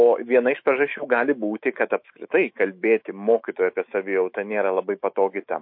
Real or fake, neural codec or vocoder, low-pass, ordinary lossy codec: fake; autoencoder, 48 kHz, 128 numbers a frame, DAC-VAE, trained on Japanese speech; 5.4 kHz; MP3, 32 kbps